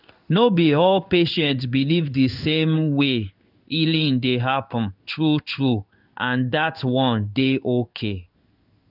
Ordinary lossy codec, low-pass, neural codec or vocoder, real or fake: none; 5.4 kHz; codec, 16 kHz in and 24 kHz out, 1 kbps, XY-Tokenizer; fake